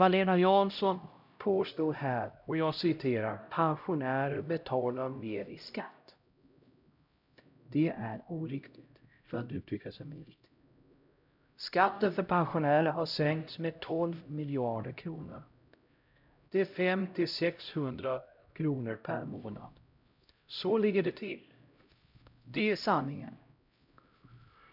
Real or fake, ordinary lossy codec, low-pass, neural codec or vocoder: fake; none; 5.4 kHz; codec, 16 kHz, 0.5 kbps, X-Codec, HuBERT features, trained on LibriSpeech